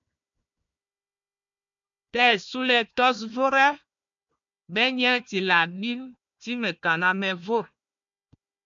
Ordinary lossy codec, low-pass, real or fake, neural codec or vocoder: MP3, 64 kbps; 7.2 kHz; fake; codec, 16 kHz, 1 kbps, FunCodec, trained on Chinese and English, 50 frames a second